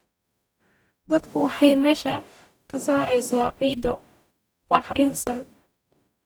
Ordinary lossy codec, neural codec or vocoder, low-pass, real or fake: none; codec, 44.1 kHz, 0.9 kbps, DAC; none; fake